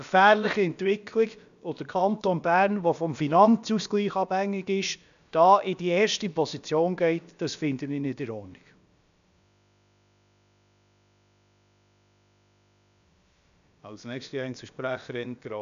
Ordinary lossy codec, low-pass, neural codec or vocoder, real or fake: none; 7.2 kHz; codec, 16 kHz, about 1 kbps, DyCAST, with the encoder's durations; fake